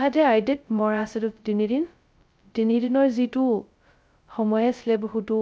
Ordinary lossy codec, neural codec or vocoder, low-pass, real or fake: none; codec, 16 kHz, 0.2 kbps, FocalCodec; none; fake